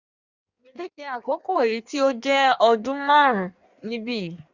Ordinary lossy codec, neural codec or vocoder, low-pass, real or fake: Opus, 64 kbps; codec, 16 kHz in and 24 kHz out, 1.1 kbps, FireRedTTS-2 codec; 7.2 kHz; fake